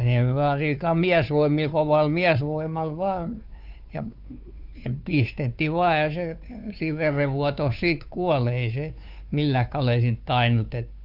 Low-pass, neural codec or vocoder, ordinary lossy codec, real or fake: 5.4 kHz; codec, 16 kHz, 2 kbps, FunCodec, trained on Chinese and English, 25 frames a second; none; fake